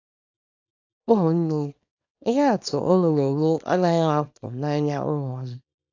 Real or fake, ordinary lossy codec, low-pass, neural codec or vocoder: fake; AAC, 48 kbps; 7.2 kHz; codec, 24 kHz, 0.9 kbps, WavTokenizer, small release